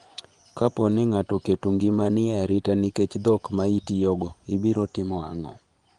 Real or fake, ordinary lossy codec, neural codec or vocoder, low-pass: fake; Opus, 24 kbps; vocoder, 24 kHz, 100 mel bands, Vocos; 10.8 kHz